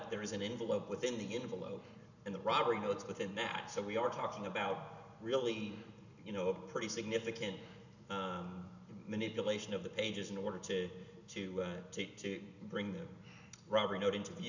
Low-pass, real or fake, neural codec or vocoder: 7.2 kHz; real; none